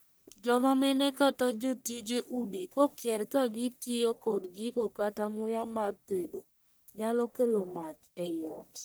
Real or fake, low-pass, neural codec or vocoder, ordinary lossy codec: fake; none; codec, 44.1 kHz, 1.7 kbps, Pupu-Codec; none